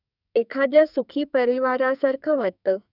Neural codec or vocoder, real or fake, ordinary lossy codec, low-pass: codec, 44.1 kHz, 2.6 kbps, SNAC; fake; none; 5.4 kHz